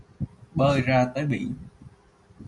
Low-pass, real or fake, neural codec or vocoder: 10.8 kHz; real; none